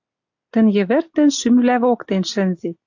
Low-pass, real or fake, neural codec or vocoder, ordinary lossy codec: 7.2 kHz; real; none; AAC, 48 kbps